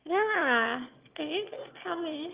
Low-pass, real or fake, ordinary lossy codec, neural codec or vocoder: 3.6 kHz; fake; Opus, 24 kbps; autoencoder, 22.05 kHz, a latent of 192 numbers a frame, VITS, trained on one speaker